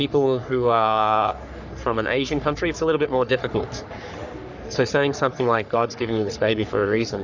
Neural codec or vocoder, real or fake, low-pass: codec, 44.1 kHz, 3.4 kbps, Pupu-Codec; fake; 7.2 kHz